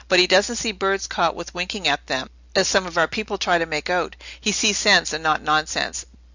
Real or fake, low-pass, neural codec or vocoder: real; 7.2 kHz; none